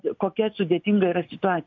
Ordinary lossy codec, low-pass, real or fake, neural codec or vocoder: MP3, 48 kbps; 7.2 kHz; real; none